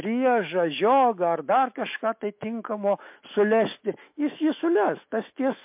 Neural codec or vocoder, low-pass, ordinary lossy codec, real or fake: autoencoder, 48 kHz, 128 numbers a frame, DAC-VAE, trained on Japanese speech; 3.6 kHz; MP3, 32 kbps; fake